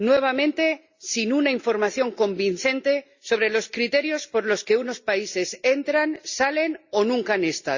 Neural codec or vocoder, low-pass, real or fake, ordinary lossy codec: none; 7.2 kHz; real; Opus, 64 kbps